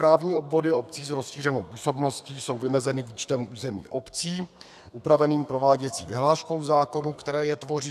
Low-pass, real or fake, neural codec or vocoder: 14.4 kHz; fake; codec, 32 kHz, 1.9 kbps, SNAC